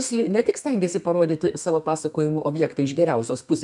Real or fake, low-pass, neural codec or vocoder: fake; 10.8 kHz; codec, 32 kHz, 1.9 kbps, SNAC